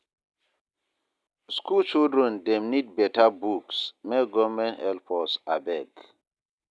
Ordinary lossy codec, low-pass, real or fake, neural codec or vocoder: none; none; real; none